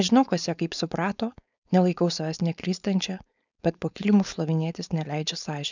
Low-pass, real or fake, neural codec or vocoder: 7.2 kHz; fake; codec, 16 kHz, 4.8 kbps, FACodec